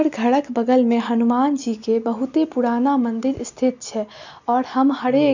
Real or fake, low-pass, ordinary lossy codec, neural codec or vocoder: real; 7.2 kHz; none; none